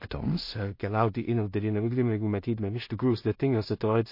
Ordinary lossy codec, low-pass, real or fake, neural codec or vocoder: MP3, 32 kbps; 5.4 kHz; fake; codec, 16 kHz in and 24 kHz out, 0.4 kbps, LongCat-Audio-Codec, two codebook decoder